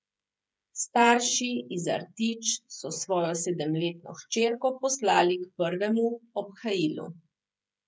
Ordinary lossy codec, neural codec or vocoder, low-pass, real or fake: none; codec, 16 kHz, 16 kbps, FreqCodec, smaller model; none; fake